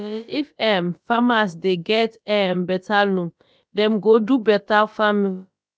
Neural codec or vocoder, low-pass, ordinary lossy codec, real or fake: codec, 16 kHz, about 1 kbps, DyCAST, with the encoder's durations; none; none; fake